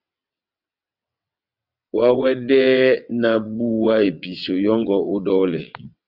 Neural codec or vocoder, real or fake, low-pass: vocoder, 22.05 kHz, 80 mel bands, WaveNeXt; fake; 5.4 kHz